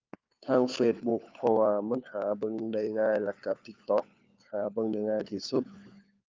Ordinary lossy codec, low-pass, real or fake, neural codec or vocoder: Opus, 24 kbps; 7.2 kHz; fake; codec, 16 kHz, 4 kbps, FunCodec, trained on LibriTTS, 50 frames a second